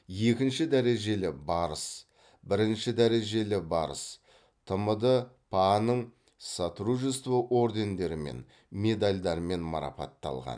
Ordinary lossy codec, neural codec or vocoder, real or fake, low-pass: none; none; real; 9.9 kHz